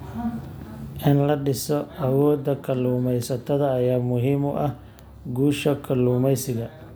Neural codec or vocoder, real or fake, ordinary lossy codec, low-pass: none; real; none; none